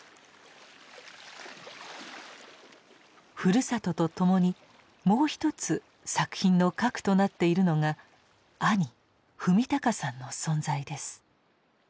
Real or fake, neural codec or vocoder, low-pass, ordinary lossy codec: real; none; none; none